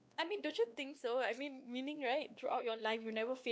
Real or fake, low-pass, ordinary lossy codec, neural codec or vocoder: fake; none; none; codec, 16 kHz, 2 kbps, X-Codec, WavLM features, trained on Multilingual LibriSpeech